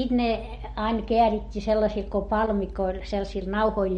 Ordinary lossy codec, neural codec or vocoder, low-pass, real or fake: MP3, 48 kbps; none; 19.8 kHz; real